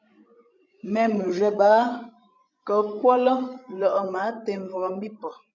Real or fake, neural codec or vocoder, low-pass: fake; codec, 16 kHz, 16 kbps, FreqCodec, larger model; 7.2 kHz